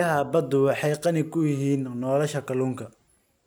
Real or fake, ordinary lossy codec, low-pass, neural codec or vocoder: real; none; none; none